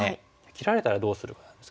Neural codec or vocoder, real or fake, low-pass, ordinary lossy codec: none; real; none; none